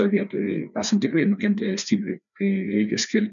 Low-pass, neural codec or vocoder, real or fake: 7.2 kHz; codec, 16 kHz, 2 kbps, FreqCodec, larger model; fake